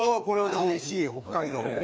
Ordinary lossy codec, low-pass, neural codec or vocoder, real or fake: none; none; codec, 16 kHz, 2 kbps, FreqCodec, larger model; fake